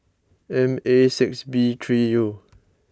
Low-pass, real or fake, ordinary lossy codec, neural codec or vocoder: none; real; none; none